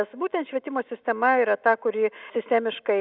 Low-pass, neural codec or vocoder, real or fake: 5.4 kHz; none; real